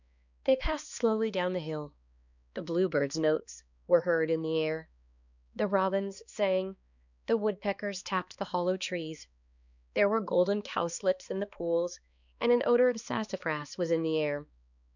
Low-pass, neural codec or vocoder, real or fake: 7.2 kHz; codec, 16 kHz, 2 kbps, X-Codec, HuBERT features, trained on balanced general audio; fake